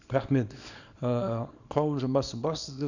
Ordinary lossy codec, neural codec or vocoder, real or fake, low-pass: none; codec, 24 kHz, 0.9 kbps, WavTokenizer, small release; fake; 7.2 kHz